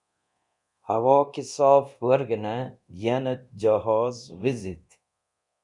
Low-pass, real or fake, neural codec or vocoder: 10.8 kHz; fake; codec, 24 kHz, 0.9 kbps, DualCodec